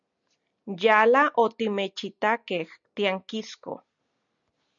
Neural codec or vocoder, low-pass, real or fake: none; 7.2 kHz; real